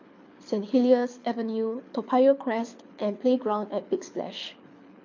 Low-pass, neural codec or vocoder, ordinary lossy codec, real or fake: 7.2 kHz; codec, 24 kHz, 6 kbps, HILCodec; MP3, 48 kbps; fake